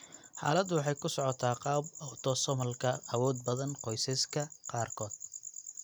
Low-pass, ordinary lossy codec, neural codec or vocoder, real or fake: none; none; none; real